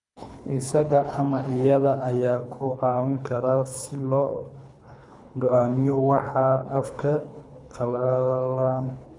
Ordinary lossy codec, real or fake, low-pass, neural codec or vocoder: none; fake; 10.8 kHz; codec, 24 kHz, 3 kbps, HILCodec